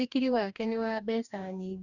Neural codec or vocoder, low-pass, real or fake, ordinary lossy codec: codec, 44.1 kHz, 2.6 kbps, DAC; 7.2 kHz; fake; none